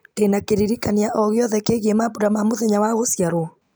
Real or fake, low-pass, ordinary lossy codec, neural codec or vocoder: real; none; none; none